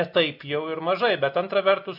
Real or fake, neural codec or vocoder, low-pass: real; none; 5.4 kHz